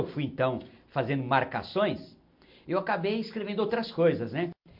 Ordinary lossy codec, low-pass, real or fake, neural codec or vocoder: MP3, 48 kbps; 5.4 kHz; real; none